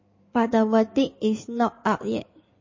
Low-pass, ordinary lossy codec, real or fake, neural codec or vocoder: 7.2 kHz; MP3, 32 kbps; fake; codec, 16 kHz in and 24 kHz out, 2.2 kbps, FireRedTTS-2 codec